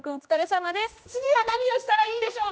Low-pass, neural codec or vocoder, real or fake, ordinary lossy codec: none; codec, 16 kHz, 1 kbps, X-Codec, HuBERT features, trained on general audio; fake; none